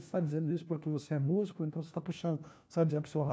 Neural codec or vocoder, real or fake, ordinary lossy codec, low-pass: codec, 16 kHz, 1 kbps, FunCodec, trained on LibriTTS, 50 frames a second; fake; none; none